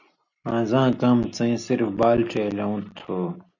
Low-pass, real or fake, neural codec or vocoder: 7.2 kHz; real; none